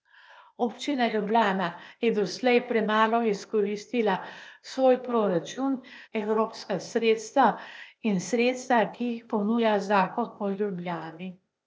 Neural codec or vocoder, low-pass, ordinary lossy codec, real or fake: codec, 16 kHz, 0.8 kbps, ZipCodec; none; none; fake